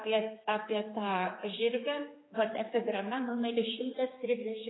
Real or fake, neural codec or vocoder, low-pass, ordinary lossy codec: fake; codec, 16 kHz, 4 kbps, X-Codec, HuBERT features, trained on general audio; 7.2 kHz; AAC, 16 kbps